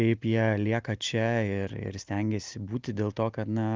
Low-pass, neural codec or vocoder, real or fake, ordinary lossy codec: 7.2 kHz; none; real; Opus, 32 kbps